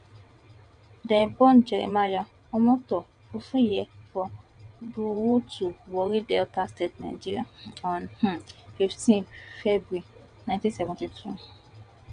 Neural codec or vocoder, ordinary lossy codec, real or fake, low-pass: vocoder, 22.05 kHz, 80 mel bands, WaveNeXt; none; fake; 9.9 kHz